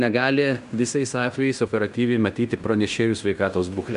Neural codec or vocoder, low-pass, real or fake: codec, 16 kHz in and 24 kHz out, 0.9 kbps, LongCat-Audio-Codec, fine tuned four codebook decoder; 10.8 kHz; fake